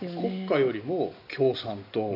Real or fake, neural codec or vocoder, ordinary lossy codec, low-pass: real; none; AAC, 48 kbps; 5.4 kHz